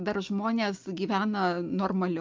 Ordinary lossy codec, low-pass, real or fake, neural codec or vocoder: Opus, 24 kbps; 7.2 kHz; real; none